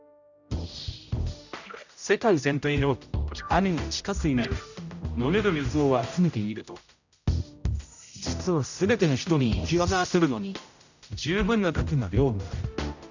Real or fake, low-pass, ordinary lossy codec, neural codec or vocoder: fake; 7.2 kHz; none; codec, 16 kHz, 0.5 kbps, X-Codec, HuBERT features, trained on general audio